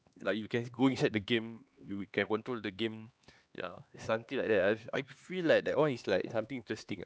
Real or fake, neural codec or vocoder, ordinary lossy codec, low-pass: fake; codec, 16 kHz, 2 kbps, X-Codec, HuBERT features, trained on LibriSpeech; none; none